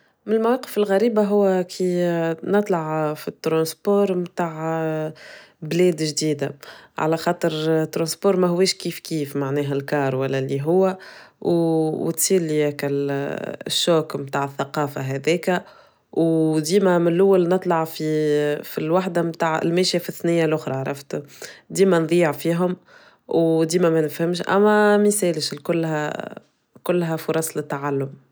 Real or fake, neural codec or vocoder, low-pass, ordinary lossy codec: real; none; none; none